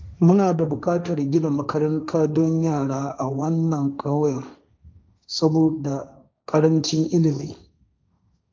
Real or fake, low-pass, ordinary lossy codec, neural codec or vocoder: fake; none; none; codec, 16 kHz, 1.1 kbps, Voila-Tokenizer